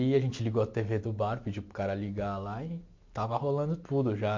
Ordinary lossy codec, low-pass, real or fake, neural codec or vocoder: MP3, 48 kbps; 7.2 kHz; real; none